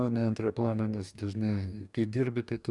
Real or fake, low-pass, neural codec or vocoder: fake; 10.8 kHz; codec, 44.1 kHz, 2.6 kbps, DAC